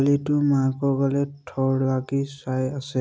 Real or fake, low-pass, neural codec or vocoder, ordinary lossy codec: real; none; none; none